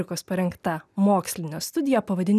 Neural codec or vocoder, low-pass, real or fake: none; 14.4 kHz; real